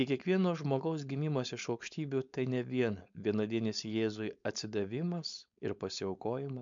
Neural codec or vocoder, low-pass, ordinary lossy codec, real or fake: codec, 16 kHz, 4.8 kbps, FACodec; 7.2 kHz; MP3, 64 kbps; fake